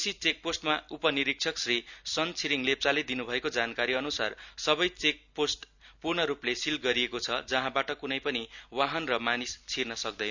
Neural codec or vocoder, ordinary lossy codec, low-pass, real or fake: none; none; 7.2 kHz; real